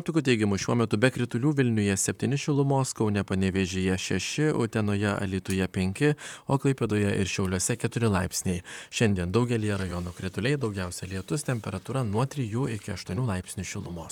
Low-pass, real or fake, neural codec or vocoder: 19.8 kHz; fake; vocoder, 44.1 kHz, 128 mel bands, Pupu-Vocoder